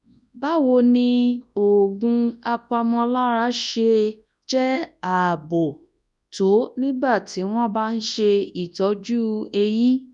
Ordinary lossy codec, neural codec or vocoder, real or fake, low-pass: none; codec, 24 kHz, 0.9 kbps, WavTokenizer, large speech release; fake; 10.8 kHz